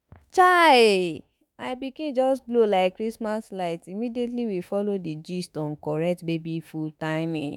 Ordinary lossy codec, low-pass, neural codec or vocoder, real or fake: none; 19.8 kHz; autoencoder, 48 kHz, 32 numbers a frame, DAC-VAE, trained on Japanese speech; fake